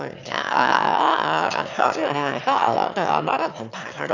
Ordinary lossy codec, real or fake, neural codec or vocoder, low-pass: none; fake; autoencoder, 22.05 kHz, a latent of 192 numbers a frame, VITS, trained on one speaker; 7.2 kHz